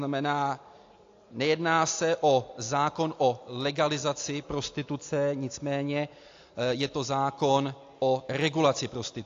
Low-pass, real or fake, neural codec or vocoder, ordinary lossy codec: 7.2 kHz; real; none; AAC, 48 kbps